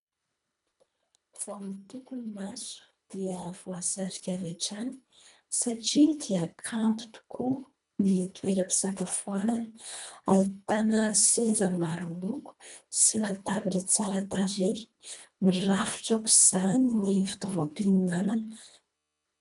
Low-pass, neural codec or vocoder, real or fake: 10.8 kHz; codec, 24 kHz, 1.5 kbps, HILCodec; fake